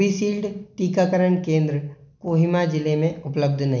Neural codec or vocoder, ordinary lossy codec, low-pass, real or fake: none; none; 7.2 kHz; real